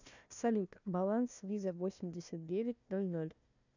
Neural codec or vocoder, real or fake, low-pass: codec, 16 kHz, 1 kbps, FunCodec, trained on Chinese and English, 50 frames a second; fake; 7.2 kHz